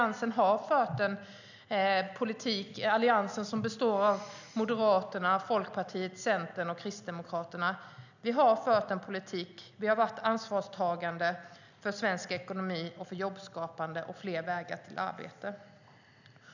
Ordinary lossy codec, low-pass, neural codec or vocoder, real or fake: none; 7.2 kHz; none; real